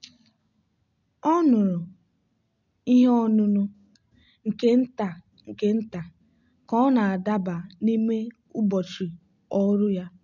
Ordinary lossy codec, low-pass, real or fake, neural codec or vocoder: none; 7.2 kHz; real; none